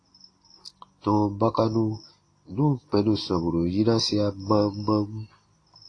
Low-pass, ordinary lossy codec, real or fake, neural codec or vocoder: 9.9 kHz; AAC, 32 kbps; real; none